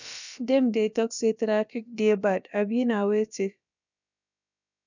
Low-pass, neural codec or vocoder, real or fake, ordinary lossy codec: 7.2 kHz; codec, 16 kHz, about 1 kbps, DyCAST, with the encoder's durations; fake; none